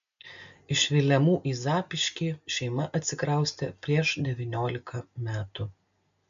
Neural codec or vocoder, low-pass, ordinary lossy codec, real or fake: none; 7.2 kHz; MP3, 64 kbps; real